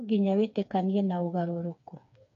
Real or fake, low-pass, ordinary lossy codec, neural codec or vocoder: fake; 7.2 kHz; none; codec, 16 kHz, 4 kbps, FreqCodec, smaller model